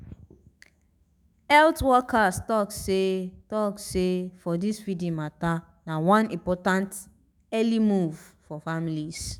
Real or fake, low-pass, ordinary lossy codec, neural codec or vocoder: fake; none; none; autoencoder, 48 kHz, 128 numbers a frame, DAC-VAE, trained on Japanese speech